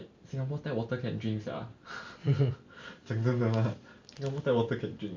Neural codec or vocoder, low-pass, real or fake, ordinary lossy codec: none; 7.2 kHz; real; MP3, 48 kbps